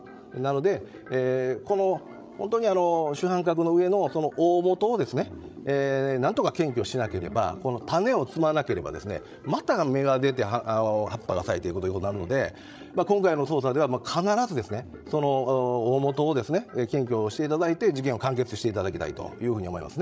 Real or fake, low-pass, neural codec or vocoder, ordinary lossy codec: fake; none; codec, 16 kHz, 16 kbps, FreqCodec, larger model; none